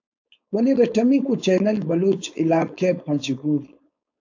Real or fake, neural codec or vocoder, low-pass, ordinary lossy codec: fake; codec, 16 kHz, 4.8 kbps, FACodec; 7.2 kHz; AAC, 48 kbps